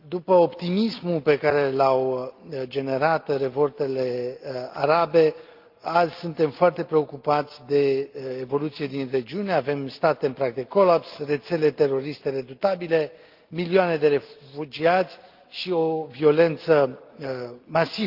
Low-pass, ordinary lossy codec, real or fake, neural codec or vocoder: 5.4 kHz; Opus, 32 kbps; real; none